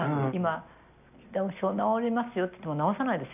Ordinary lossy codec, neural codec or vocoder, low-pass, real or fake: none; none; 3.6 kHz; real